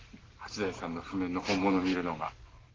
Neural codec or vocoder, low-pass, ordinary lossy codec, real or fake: none; 7.2 kHz; Opus, 16 kbps; real